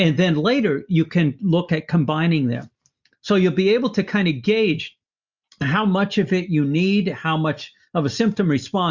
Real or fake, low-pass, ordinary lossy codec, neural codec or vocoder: real; 7.2 kHz; Opus, 64 kbps; none